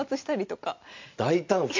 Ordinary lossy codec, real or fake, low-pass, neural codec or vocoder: MP3, 48 kbps; real; 7.2 kHz; none